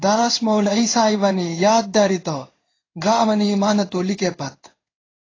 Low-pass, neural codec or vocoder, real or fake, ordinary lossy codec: 7.2 kHz; codec, 16 kHz in and 24 kHz out, 1 kbps, XY-Tokenizer; fake; AAC, 32 kbps